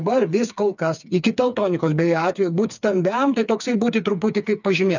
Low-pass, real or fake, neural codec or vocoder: 7.2 kHz; fake; codec, 16 kHz, 4 kbps, FreqCodec, smaller model